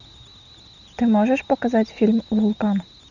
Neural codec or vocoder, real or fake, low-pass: none; real; 7.2 kHz